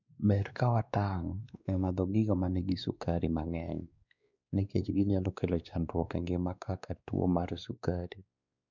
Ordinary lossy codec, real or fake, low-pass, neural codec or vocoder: none; fake; 7.2 kHz; codec, 16 kHz, 2 kbps, X-Codec, WavLM features, trained on Multilingual LibriSpeech